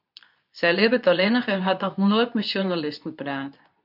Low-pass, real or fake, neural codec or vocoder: 5.4 kHz; fake; codec, 24 kHz, 0.9 kbps, WavTokenizer, medium speech release version 2